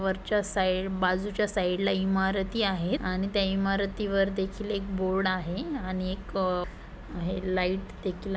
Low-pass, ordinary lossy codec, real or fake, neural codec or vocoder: none; none; real; none